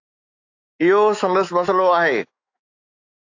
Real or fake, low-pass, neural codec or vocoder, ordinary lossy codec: fake; 7.2 kHz; autoencoder, 48 kHz, 128 numbers a frame, DAC-VAE, trained on Japanese speech; AAC, 48 kbps